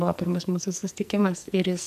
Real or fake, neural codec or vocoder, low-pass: fake; codec, 44.1 kHz, 2.6 kbps, SNAC; 14.4 kHz